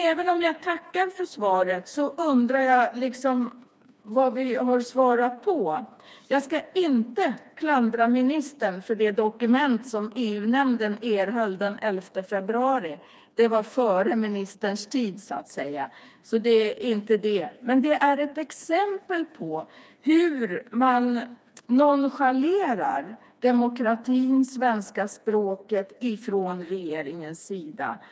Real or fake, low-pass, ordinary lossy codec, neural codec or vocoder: fake; none; none; codec, 16 kHz, 2 kbps, FreqCodec, smaller model